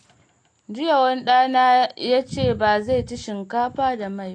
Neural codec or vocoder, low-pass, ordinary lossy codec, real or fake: none; 9.9 kHz; AAC, 48 kbps; real